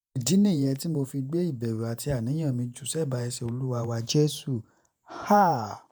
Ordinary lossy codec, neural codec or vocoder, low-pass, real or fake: none; vocoder, 48 kHz, 128 mel bands, Vocos; none; fake